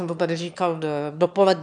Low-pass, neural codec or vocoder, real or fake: 9.9 kHz; autoencoder, 22.05 kHz, a latent of 192 numbers a frame, VITS, trained on one speaker; fake